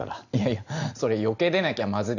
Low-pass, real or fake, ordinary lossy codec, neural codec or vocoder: 7.2 kHz; real; none; none